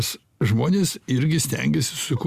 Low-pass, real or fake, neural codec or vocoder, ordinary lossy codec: 14.4 kHz; real; none; Opus, 64 kbps